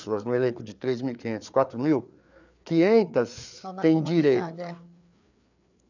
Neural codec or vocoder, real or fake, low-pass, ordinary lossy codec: codec, 16 kHz, 4 kbps, FreqCodec, larger model; fake; 7.2 kHz; none